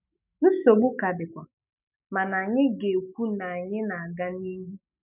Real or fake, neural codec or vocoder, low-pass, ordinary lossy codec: fake; autoencoder, 48 kHz, 128 numbers a frame, DAC-VAE, trained on Japanese speech; 3.6 kHz; none